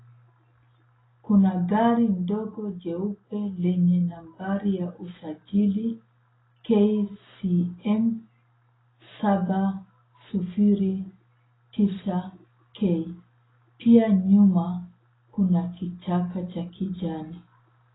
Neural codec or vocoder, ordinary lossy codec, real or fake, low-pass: none; AAC, 16 kbps; real; 7.2 kHz